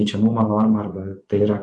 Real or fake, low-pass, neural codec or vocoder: fake; 10.8 kHz; vocoder, 24 kHz, 100 mel bands, Vocos